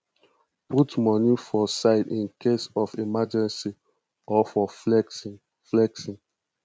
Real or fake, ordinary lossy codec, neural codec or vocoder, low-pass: real; none; none; none